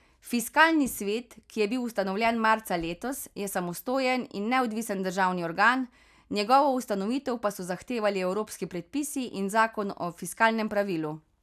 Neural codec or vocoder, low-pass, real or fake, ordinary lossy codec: none; 14.4 kHz; real; none